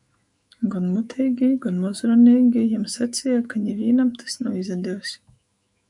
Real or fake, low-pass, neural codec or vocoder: fake; 10.8 kHz; autoencoder, 48 kHz, 128 numbers a frame, DAC-VAE, trained on Japanese speech